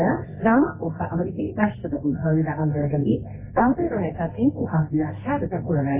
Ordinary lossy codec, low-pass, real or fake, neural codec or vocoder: MP3, 16 kbps; 3.6 kHz; fake; codec, 24 kHz, 0.9 kbps, WavTokenizer, medium music audio release